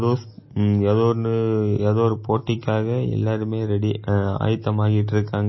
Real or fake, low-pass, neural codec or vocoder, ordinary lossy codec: real; 7.2 kHz; none; MP3, 24 kbps